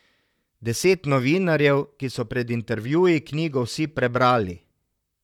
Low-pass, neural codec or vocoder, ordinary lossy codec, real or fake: 19.8 kHz; vocoder, 44.1 kHz, 128 mel bands, Pupu-Vocoder; none; fake